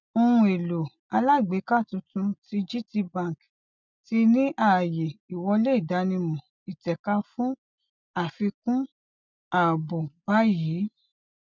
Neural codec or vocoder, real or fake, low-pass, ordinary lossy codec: none; real; 7.2 kHz; none